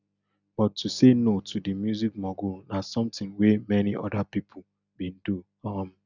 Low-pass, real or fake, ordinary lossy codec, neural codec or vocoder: 7.2 kHz; real; none; none